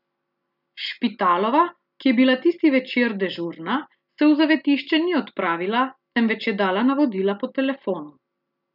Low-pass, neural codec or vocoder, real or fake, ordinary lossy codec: 5.4 kHz; none; real; none